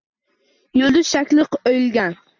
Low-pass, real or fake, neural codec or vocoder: 7.2 kHz; real; none